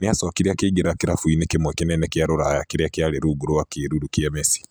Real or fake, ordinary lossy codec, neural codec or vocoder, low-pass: real; none; none; none